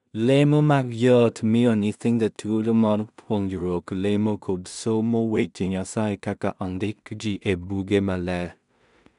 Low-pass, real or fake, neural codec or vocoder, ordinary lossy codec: 10.8 kHz; fake; codec, 16 kHz in and 24 kHz out, 0.4 kbps, LongCat-Audio-Codec, two codebook decoder; none